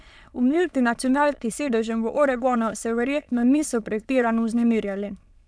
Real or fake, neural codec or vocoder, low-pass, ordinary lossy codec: fake; autoencoder, 22.05 kHz, a latent of 192 numbers a frame, VITS, trained on many speakers; none; none